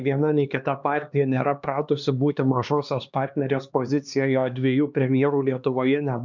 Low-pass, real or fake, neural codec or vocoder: 7.2 kHz; fake; codec, 16 kHz, 2 kbps, X-Codec, HuBERT features, trained on LibriSpeech